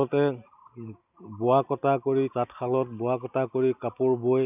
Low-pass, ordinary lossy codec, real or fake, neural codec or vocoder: 3.6 kHz; none; real; none